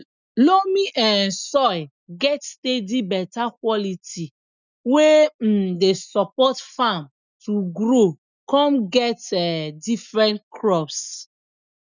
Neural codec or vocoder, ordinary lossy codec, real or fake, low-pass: none; none; real; 7.2 kHz